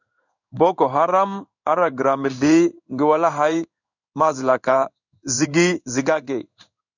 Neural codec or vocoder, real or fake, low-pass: codec, 16 kHz in and 24 kHz out, 1 kbps, XY-Tokenizer; fake; 7.2 kHz